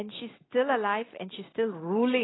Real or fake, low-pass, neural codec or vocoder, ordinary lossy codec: real; 7.2 kHz; none; AAC, 16 kbps